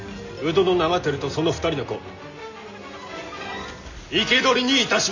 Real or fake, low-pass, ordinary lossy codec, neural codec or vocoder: real; 7.2 kHz; none; none